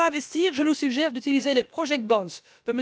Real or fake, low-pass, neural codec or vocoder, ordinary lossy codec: fake; none; codec, 16 kHz, about 1 kbps, DyCAST, with the encoder's durations; none